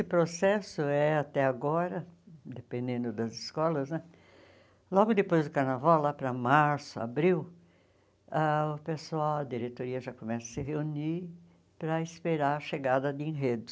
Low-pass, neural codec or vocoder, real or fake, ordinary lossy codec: none; none; real; none